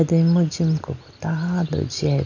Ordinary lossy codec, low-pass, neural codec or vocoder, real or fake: none; 7.2 kHz; none; real